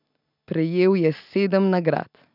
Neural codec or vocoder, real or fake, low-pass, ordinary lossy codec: none; real; 5.4 kHz; none